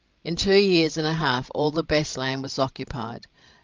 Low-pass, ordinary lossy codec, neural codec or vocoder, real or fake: 7.2 kHz; Opus, 32 kbps; codec, 16 kHz, 16 kbps, FreqCodec, larger model; fake